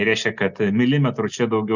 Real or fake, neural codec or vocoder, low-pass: real; none; 7.2 kHz